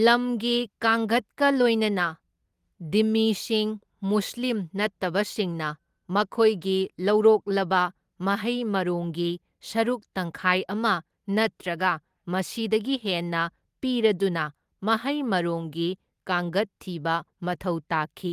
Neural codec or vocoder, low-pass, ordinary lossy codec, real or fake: none; 14.4 kHz; Opus, 24 kbps; real